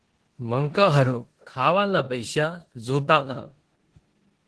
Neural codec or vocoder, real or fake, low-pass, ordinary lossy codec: codec, 16 kHz in and 24 kHz out, 0.9 kbps, LongCat-Audio-Codec, four codebook decoder; fake; 10.8 kHz; Opus, 16 kbps